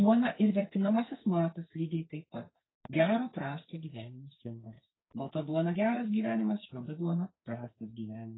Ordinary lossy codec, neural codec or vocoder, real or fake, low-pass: AAC, 16 kbps; codec, 44.1 kHz, 2.6 kbps, SNAC; fake; 7.2 kHz